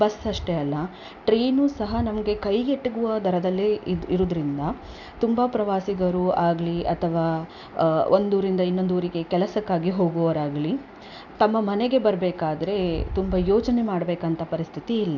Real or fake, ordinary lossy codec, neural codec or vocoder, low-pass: real; none; none; 7.2 kHz